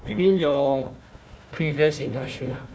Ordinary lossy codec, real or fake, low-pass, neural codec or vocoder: none; fake; none; codec, 16 kHz, 1 kbps, FunCodec, trained on Chinese and English, 50 frames a second